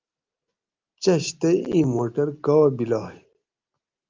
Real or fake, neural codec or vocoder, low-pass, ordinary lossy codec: real; none; 7.2 kHz; Opus, 32 kbps